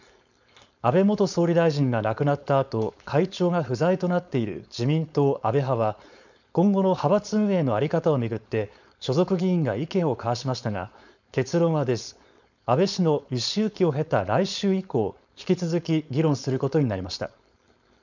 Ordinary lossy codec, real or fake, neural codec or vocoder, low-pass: none; fake; codec, 16 kHz, 4.8 kbps, FACodec; 7.2 kHz